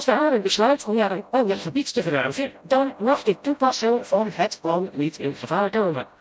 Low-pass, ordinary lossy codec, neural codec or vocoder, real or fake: none; none; codec, 16 kHz, 0.5 kbps, FreqCodec, smaller model; fake